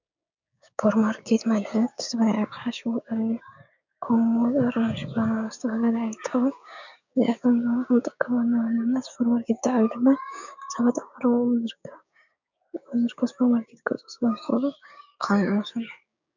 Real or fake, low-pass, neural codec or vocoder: fake; 7.2 kHz; codec, 16 kHz, 6 kbps, DAC